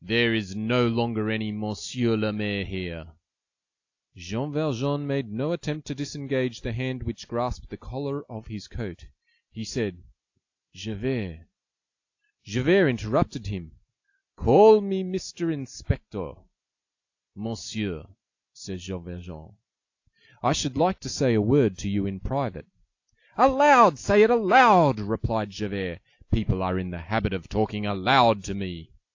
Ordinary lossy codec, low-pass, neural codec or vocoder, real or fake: AAC, 48 kbps; 7.2 kHz; none; real